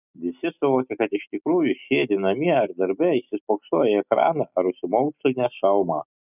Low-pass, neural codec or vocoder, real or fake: 3.6 kHz; none; real